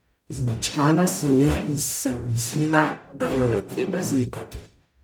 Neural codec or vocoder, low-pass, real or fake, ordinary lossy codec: codec, 44.1 kHz, 0.9 kbps, DAC; none; fake; none